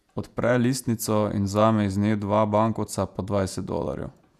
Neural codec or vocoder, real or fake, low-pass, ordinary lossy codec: vocoder, 48 kHz, 128 mel bands, Vocos; fake; 14.4 kHz; none